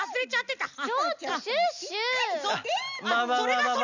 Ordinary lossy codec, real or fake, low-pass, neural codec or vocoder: none; real; 7.2 kHz; none